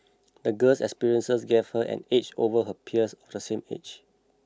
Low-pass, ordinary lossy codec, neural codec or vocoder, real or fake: none; none; none; real